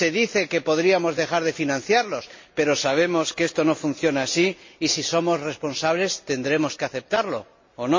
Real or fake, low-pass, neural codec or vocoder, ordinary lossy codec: real; 7.2 kHz; none; MP3, 32 kbps